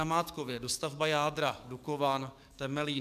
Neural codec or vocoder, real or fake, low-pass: codec, 44.1 kHz, 7.8 kbps, DAC; fake; 14.4 kHz